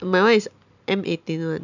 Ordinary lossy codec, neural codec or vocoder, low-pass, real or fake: none; none; 7.2 kHz; real